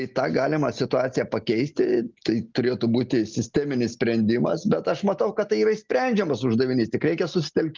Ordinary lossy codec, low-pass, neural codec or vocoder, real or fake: Opus, 32 kbps; 7.2 kHz; none; real